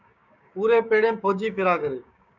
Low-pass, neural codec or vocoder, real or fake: 7.2 kHz; codec, 16 kHz, 6 kbps, DAC; fake